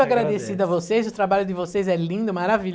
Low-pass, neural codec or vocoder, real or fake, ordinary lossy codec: none; none; real; none